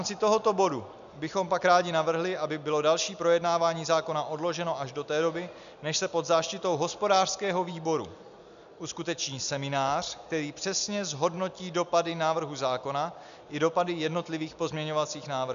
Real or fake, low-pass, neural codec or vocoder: real; 7.2 kHz; none